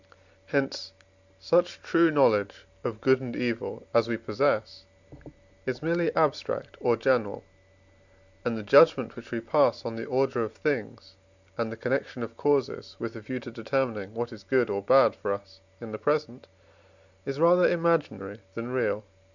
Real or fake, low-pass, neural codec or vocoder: real; 7.2 kHz; none